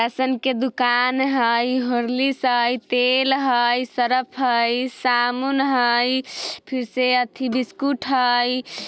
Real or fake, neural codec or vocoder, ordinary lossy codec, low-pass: real; none; none; none